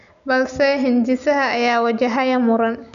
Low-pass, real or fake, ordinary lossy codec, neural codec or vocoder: 7.2 kHz; real; none; none